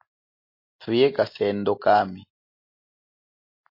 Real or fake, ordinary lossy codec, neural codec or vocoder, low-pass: real; MP3, 32 kbps; none; 5.4 kHz